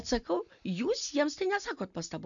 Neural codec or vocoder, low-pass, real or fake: none; 7.2 kHz; real